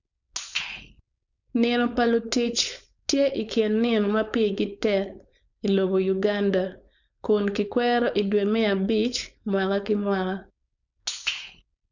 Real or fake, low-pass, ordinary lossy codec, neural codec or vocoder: fake; 7.2 kHz; none; codec, 16 kHz, 4.8 kbps, FACodec